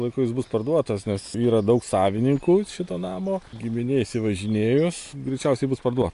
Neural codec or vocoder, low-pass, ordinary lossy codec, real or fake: none; 10.8 kHz; AAC, 64 kbps; real